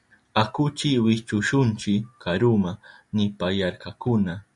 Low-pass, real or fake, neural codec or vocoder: 10.8 kHz; real; none